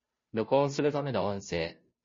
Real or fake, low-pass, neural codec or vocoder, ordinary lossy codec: fake; 7.2 kHz; codec, 16 kHz, 0.5 kbps, FunCodec, trained on Chinese and English, 25 frames a second; MP3, 32 kbps